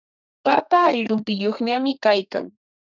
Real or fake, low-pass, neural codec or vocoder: fake; 7.2 kHz; codec, 32 kHz, 1.9 kbps, SNAC